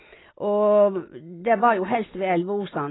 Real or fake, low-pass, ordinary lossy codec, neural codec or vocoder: real; 7.2 kHz; AAC, 16 kbps; none